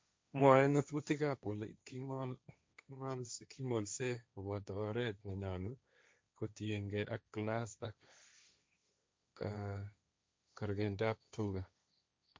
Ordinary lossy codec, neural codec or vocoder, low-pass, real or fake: none; codec, 16 kHz, 1.1 kbps, Voila-Tokenizer; none; fake